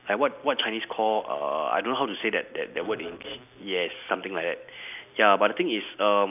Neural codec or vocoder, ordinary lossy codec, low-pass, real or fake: none; none; 3.6 kHz; real